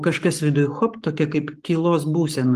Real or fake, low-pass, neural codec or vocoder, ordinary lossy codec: fake; 14.4 kHz; codec, 44.1 kHz, 7.8 kbps, Pupu-Codec; Opus, 24 kbps